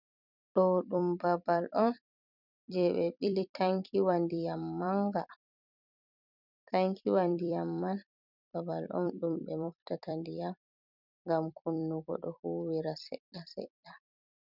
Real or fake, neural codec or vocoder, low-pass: fake; vocoder, 44.1 kHz, 128 mel bands every 256 samples, BigVGAN v2; 5.4 kHz